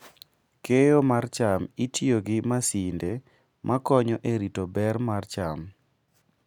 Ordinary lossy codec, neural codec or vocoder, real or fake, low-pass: none; none; real; 19.8 kHz